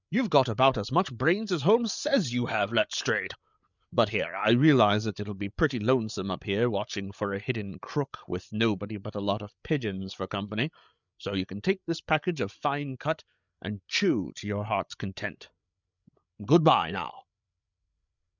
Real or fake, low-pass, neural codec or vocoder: fake; 7.2 kHz; codec, 16 kHz, 8 kbps, FreqCodec, larger model